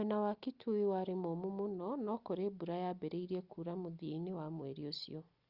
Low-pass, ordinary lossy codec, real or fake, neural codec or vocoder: 5.4 kHz; Opus, 32 kbps; real; none